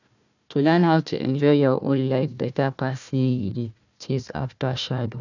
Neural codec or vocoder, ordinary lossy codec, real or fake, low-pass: codec, 16 kHz, 1 kbps, FunCodec, trained on Chinese and English, 50 frames a second; none; fake; 7.2 kHz